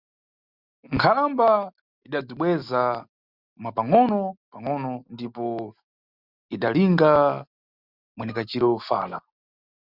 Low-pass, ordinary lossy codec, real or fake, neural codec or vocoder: 5.4 kHz; Opus, 64 kbps; real; none